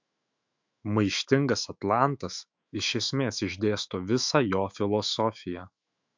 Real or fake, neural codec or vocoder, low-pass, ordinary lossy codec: fake; autoencoder, 48 kHz, 128 numbers a frame, DAC-VAE, trained on Japanese speech; 7.2 kHz; MP3, 64 kbps